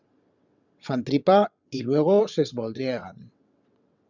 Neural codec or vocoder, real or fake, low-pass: vocoder, 22.05 kHz, 80 mel bands, WaveNeXt; fake; 7.2 kHz